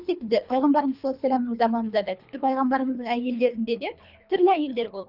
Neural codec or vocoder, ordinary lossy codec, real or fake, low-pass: codec, 24 kHz, 3 kbps, HILCodec; none; fake; 5.4 kHz